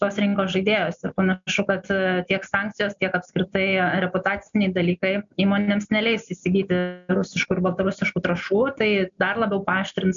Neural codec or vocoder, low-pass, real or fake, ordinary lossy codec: none; 7.2 kHz; real; MP3, 64 kbps